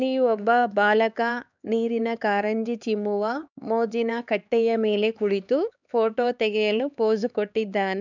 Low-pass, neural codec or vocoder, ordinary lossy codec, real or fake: 7.2 kHz; codec, 16 kHz, 4 kbps, X-Codec, HuBERT features, trained on LibriSpeech; none; fake